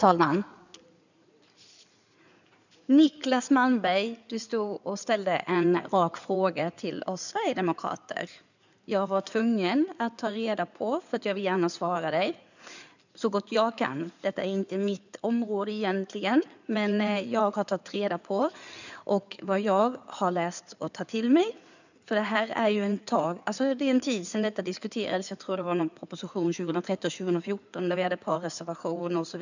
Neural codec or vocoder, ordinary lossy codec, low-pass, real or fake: codec, 16 kHz in and 24 kHz out, 2.2 kbps, FireRedTTS-2 codec; none; 7.2 kHz; fake